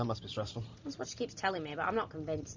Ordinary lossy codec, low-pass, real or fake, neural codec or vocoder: MP3, 64 kbps; 7.2 kHz; real; none